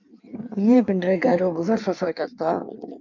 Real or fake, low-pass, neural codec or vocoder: fake; 7.2 kHz; codec, 16 kHz in and 24 kHz out, 1.1 kbps, FireRedTTS-2 codec